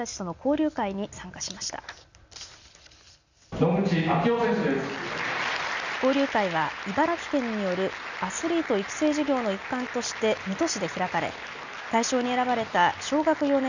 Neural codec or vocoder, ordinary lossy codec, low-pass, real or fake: none; none; 7.2 kHz; real